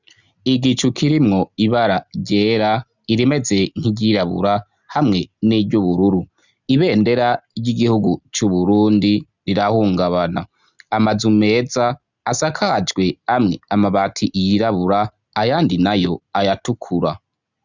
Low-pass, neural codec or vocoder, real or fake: 7.2 kHz; none; real